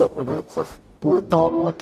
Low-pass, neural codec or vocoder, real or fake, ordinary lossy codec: 14.4 kHz; codec, 44.1 kHz, 0.9 kbps, DAC; fake; none